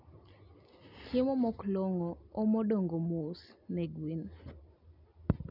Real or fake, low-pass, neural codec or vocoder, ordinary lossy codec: real; 5.4 kHz; none; none